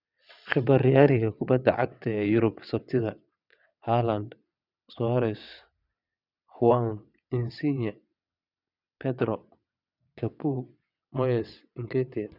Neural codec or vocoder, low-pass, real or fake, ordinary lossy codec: vocoder, 22.05 kHz, 80 mel bands, WaveNeXt; 5.4 kHz; fake; none